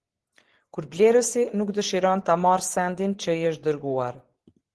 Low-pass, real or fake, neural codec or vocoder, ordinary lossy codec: 10.8 kHz; fake; vocoder, 24 kHz, 100 mel bands, Vocos; Opus, 16 kbps